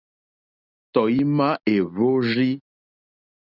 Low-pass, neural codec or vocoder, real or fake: 5.4 kHz; none; real